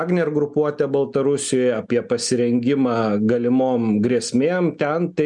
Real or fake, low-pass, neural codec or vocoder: real; 10.8 kHz; none